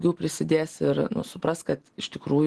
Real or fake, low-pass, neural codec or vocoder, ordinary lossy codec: real; 10.8 kHz; none; Opus, 16 kbps